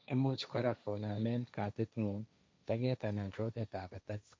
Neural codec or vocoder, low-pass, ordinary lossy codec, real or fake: codec, 16 kHz, 1.1 kbps, Voila-Tokenizer; none; none; fake